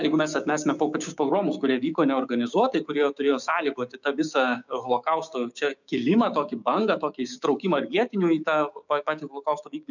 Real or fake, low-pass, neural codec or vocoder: fake; 7.2 kHz; codec, 16 kHz, 6 kbps, DAC